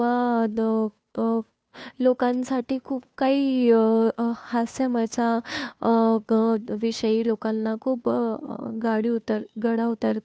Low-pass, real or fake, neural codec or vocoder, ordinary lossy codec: none; fake; codec, 16 kHz, 2 kbps, FunCodec, trained on Chinese and English, 25 frames a second; none